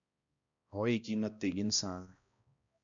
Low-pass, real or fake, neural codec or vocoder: 7.2 kHz; fake; codec, 16 kHz, 1 kbps, X-Codec, HuBERT features, trained on balanced general audio